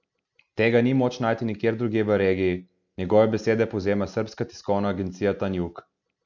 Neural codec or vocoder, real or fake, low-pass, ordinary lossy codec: none; real; 7.2 kHz; none